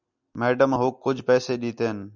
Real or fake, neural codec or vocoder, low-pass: real; none; 7.2 kHz